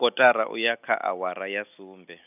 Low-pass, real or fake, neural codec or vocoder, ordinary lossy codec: 3.6 kHz; real; none; none